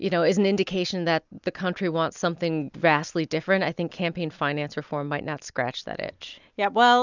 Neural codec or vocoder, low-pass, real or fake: none; 7.2 kHz; real